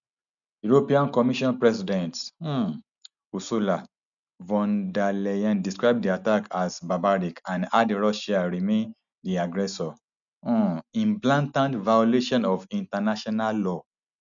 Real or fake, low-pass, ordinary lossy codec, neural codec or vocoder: real; 7.2 kHz; none; none